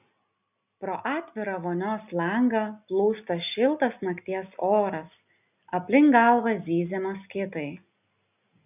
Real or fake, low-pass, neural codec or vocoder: real; 3.6 kHz; none